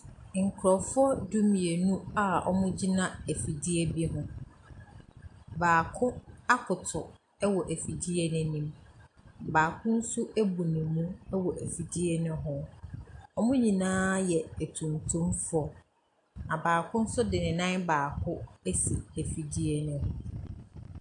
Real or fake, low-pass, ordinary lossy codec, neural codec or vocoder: real; 10.8 kHz; AAC, 64 kbps; none